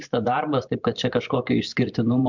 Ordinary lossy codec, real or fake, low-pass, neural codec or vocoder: MP3, 64 kbps; fake; 7.2 kHz; vocoder, 44.1 kHz, 128 mel bands every 512 samples, BigVGAN v2